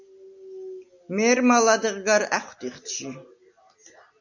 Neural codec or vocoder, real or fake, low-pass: none; real; 7.2 kHz